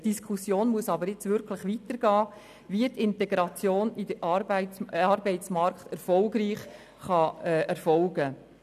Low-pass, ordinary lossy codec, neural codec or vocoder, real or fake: 14.4 kHz; none; none; real